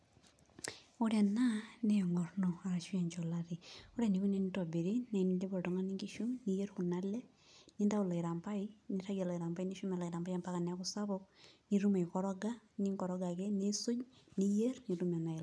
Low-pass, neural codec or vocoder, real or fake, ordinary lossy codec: none; none; real; none